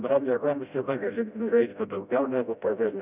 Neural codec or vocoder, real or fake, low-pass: codec, 16 kHz, 0.5 kbps, FreqCodec, smaller model; fake; 3.6 kHz